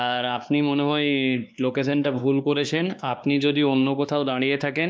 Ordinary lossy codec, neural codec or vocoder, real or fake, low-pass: none; codec, 16 kHz, 2 kbps, X-Codec, WavLM features, trained on Multilingual LibriSpeech; fake; none